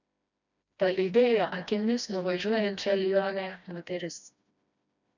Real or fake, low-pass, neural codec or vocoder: fake; 7.2 kHz; codec, 16 kHz, 1 kbps, FreqCodec, smaller model